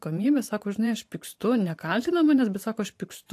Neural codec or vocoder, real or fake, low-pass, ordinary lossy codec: vocoder, 44.1 kHz, 128 mel bands every 512 samples, BigVGAN v2; fake; 14.4 kHz; AAC, 64 kbps